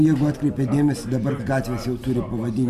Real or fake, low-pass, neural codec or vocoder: real; 14.4 kHz; none